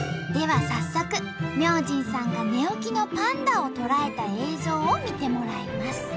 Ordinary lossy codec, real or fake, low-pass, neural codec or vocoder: none; real; none; none